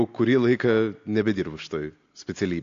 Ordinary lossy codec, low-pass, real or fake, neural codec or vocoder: AAC, 48 kbps; 7.2 kHz; real; none